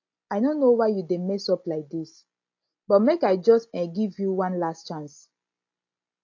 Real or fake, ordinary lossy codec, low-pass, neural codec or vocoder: real; AAC, 48 kbps; 7.2 kHz; none